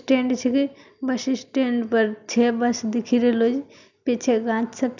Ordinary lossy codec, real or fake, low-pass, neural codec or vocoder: none; real; 7.2 kHz; none